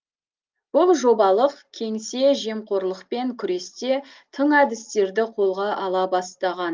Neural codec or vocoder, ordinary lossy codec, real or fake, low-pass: none; Opus, 24 kbps; real; 7.2 kHz